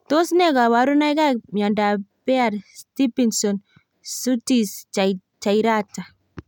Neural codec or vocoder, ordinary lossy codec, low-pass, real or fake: none; none; 19.8 kHz; real